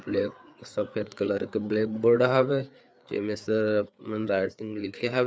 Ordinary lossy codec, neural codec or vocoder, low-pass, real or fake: none; codec, 16 kHz, 4 kbps, FreqCodec, larger model; none; fake